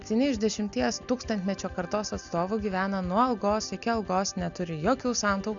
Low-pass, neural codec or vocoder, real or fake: 7.2 kHz; none; real